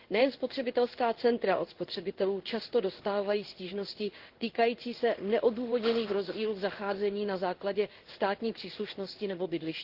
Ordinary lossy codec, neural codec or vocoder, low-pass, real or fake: Opus, 16 kbps; codec, 16 kHz in and 24 kHz out, 1 kbps, XY-Tokenizer; 5.4 kHz; fake